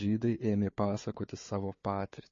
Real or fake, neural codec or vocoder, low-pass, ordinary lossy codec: fake; codec, 16 kHz, 4 kbps, FunCodec, trained on Chinese and English, 50 frames a second; 7.2 kHz; MP3, 32 kbps